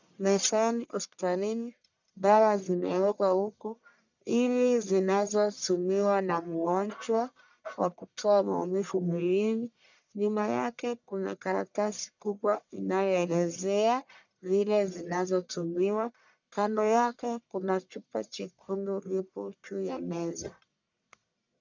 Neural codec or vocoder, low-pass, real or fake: codec, 44.1 kHz, 1.7 kbps, Pupu-Codec; 7.2 kHz; fake